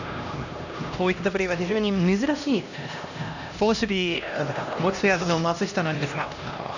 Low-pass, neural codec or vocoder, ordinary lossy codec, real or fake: 7.2 kHz; codec, 16 kHz, 1 kbps, X-Codec, HuBERT features, trained on LibriSpeech; none; fake